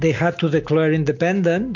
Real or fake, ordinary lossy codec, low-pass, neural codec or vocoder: real; MP3, 48 kbps; 7.2 kHz; none